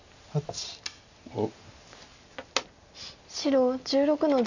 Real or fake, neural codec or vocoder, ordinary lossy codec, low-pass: real; none; none; 7.2 kHz